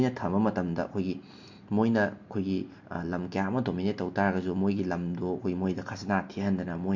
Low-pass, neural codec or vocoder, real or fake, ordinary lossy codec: 7.2 kHz; none; real; MP3, 48 kbps